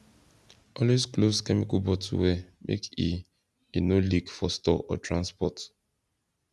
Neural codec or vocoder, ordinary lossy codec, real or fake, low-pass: none; none; real; none